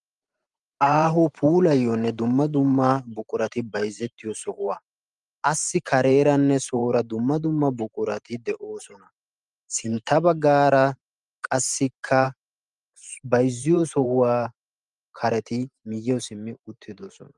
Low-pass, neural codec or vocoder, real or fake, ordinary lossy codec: 10.8 kHz; vocoder, 44.1 kHz, 128 mel bands every 512 samples, BigVGAN v2; fake; Opus, 32 kbps